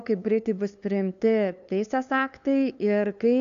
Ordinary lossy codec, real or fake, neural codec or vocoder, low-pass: MP3, 96 kbps; fake; codec, 16 kHz, 2 kbps, FunCodec, trained on LibriTTS, 25 frames a second; 7.2 kHz